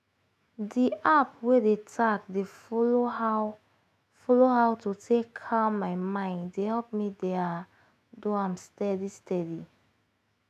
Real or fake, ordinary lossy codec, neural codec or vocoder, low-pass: fake; none; autoencoder, 48 kHz, 128 numbers a frame, DAC-VAE, trained on Japanese speech; 14.4 kHz